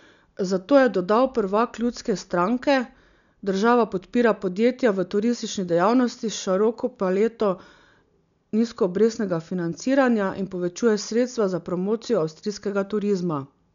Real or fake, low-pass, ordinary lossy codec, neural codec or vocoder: real; 7.2 kHz; none; none